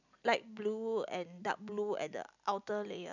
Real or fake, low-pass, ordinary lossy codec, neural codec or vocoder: real; 7.2 kHz; none; none